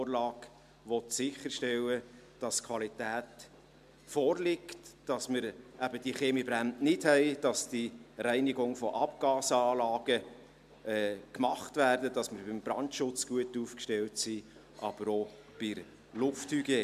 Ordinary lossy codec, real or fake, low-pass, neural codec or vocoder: MP3, 96 kbps; real; 14.4 kHz; none